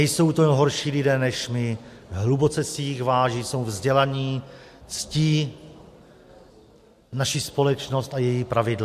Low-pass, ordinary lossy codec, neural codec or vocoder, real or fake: 14.4 kHz; MP3, 64 kbps; none; real